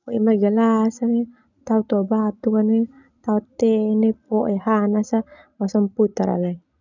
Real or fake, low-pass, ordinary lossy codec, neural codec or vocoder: real; 7.2 kHz; none; none